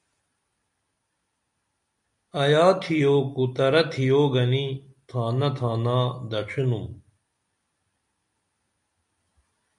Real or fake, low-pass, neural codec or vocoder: real; 10.8 kHz; none